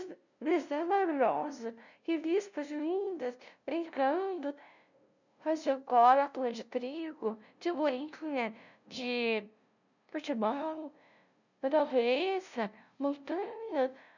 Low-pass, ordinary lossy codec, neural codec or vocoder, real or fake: 7.2 kHz; none; codec, 16 kHz, 0.5 kbps, FunCodec, trained on LibriTTS, 25 frames a second; fake